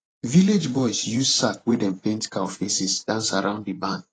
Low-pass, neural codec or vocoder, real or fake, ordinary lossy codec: 9.9 kHz; vocoder, 24 kHz, 100 mel bands, Vocos; fake; AAC, 32 kbps